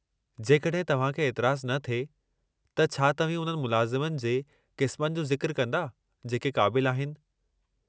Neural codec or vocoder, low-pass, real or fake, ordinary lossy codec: none; none; real; none